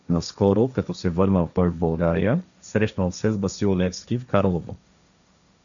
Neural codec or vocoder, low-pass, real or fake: codec, 16 kHz, 1.1 kbps, Voila-Tokenizer; 7.2 kHz; fake